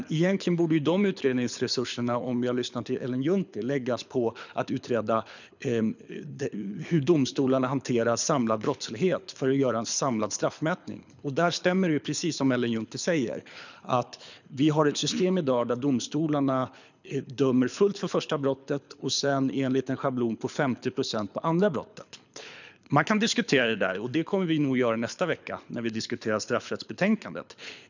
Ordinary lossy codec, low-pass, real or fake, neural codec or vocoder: none; 7.2 kHz; fake; codec, 24 kHz, 6 kbps, HILCodec